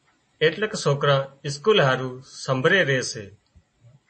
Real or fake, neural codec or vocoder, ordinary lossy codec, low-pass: real; none; MP3, 32 kbps; 10.8 kHz